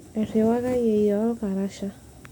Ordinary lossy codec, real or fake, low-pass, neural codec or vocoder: none; real; none; none